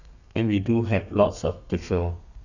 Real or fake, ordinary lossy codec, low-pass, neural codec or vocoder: fake; none; 7.2 kHz; codec, 32 kHz, 1.9 kbps, SNAC